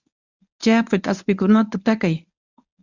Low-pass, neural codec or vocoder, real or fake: 7.2 kHz; codec, 24 kHz, 0.9 kbps, WavTokenizer, medium speech release version 1; fake